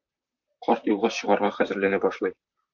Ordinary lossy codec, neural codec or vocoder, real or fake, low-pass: MP3, 64 kbps; vocoder, 44.1 kHz, 128 mel bands, Pupu-Vocoder; fake; 7.2 kHz